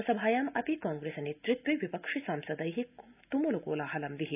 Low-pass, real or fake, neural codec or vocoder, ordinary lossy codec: 3.6 kHz; real; none; none